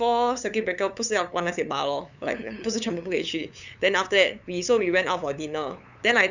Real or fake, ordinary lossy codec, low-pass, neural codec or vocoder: fake; none; 7.2 kHz; codec, 16 kHz, 8 kbps, FunCodec, trained on LibriTTS, 25 frames a second